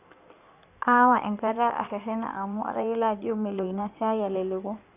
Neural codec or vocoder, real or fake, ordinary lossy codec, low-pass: codec, 16 kHz in and 24 kHz out, 2.2 kbps, FireRedTTS-2 codec; fake; none; 3.6 kHz